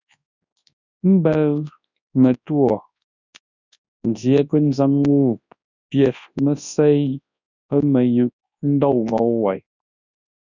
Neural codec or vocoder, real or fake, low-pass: codec, 24 kHz, 0.9 kbps, WavTokenizer, large speech release; fake; 7.2 kHz